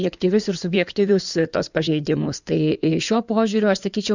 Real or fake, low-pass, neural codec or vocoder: fake; 7.2 kHz; codec, 16 kHz in and 24 kHz out, 2.2 kbps, FireRedTTS-2 codec